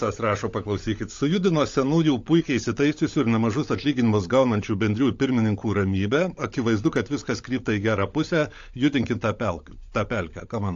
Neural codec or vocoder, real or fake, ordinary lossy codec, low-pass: codec, 16 kHz, 16 kbps, FunCodec, trained on LibriTTS, 50 frames a second; fake; AAC, 48 kbps; 7.2 kHz